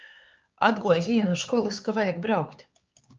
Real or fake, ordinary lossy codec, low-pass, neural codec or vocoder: fake; Opus, 24 kbps; 7.2 kHz; codec, 16 kHz, 4 kbps, X-Codec, HuBERT features, trained on LibriSpeech